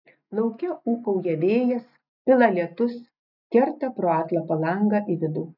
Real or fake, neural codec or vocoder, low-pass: real; none; 5.4 kHz